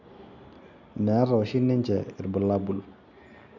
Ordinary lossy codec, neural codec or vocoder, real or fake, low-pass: none; none; real; 7.2 kHz